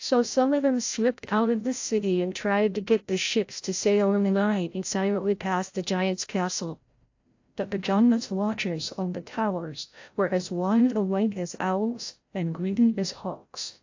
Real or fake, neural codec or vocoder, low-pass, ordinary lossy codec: fake; codec, 16 kHz, 0.5 kbps, FreqCodec, larger model; 7.2 kHz; AAC, 48 kbps